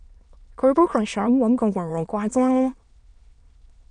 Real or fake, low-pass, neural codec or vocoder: fake; 9.9 kHz; autoencoder, 22.05 kHz, a latent of 192 numbers a frame, VITS, trained on many speakers